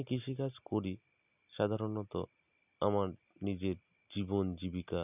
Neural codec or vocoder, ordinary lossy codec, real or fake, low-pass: none; none; real; 3.6 kHz